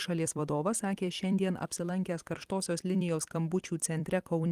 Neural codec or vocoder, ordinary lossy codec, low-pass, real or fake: vocoder, 44.1 kHz, 128 mel bands every 256 samples, BigVGAN v2; Opus, 24 kbps; 14.4 kHz; fake